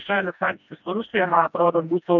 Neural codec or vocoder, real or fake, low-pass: codec, 16 kHz, 1 kbps, FreqCodec, smaller model; fake; 7.2 kHz